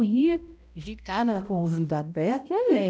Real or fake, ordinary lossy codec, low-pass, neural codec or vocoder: fake; none; none; codec, 16 kHz, 0.5 kbps, X-Codec, HuBERT features, trained on balanced general audio